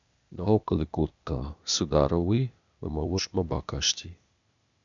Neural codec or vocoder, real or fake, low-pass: codec, 16 kHz, 0.8 kbps, ZipCodec; fake; 7.2 kHz